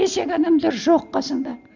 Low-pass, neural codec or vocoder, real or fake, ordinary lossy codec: 7.2 kHz; none; real; none